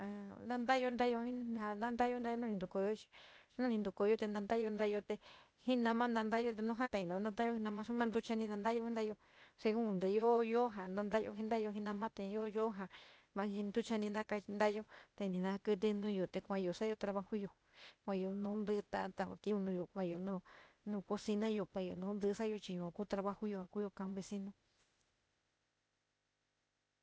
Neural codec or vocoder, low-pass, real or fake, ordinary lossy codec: codec, 16 kHz, 0.8 kbps, ZipCodec; none; fake; none